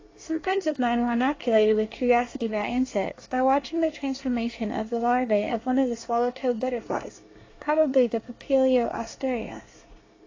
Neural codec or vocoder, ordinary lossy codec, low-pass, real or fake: codec, 24 kHz, 1 kbps, SNAC; AAC, 32 kbps; 7.2 kHz; fake